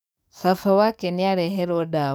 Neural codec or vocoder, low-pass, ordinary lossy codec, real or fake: codec, 44.1 kHz, 7.8 kbps, DAC; none; none; fake